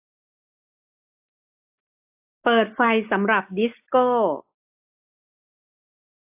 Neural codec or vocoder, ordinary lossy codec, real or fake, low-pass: none; AAC, 32 kbps; real; 3.6 kHz